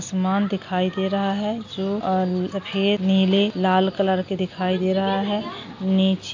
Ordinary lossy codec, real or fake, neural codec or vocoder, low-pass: AAC, 48 kbps; real; none; 7.2 kHz